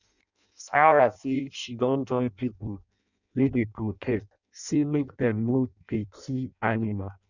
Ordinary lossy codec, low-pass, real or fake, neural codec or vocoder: none; 7.2 kHz; fake; codec, 16 kHz in and 24 kHz out, 0.6 kbps, FireRedTTS-2 codec